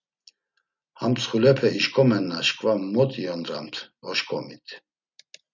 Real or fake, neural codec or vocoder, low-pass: real; none; 7.2 kHz